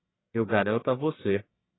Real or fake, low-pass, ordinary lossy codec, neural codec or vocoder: fake; 7.2 kHz; AAC, 16 kbps; codec, 44.1 kHz, 1.7 kbps, Pupu-Codec